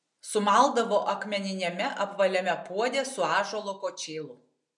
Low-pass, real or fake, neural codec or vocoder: 10.8 kHz; real; none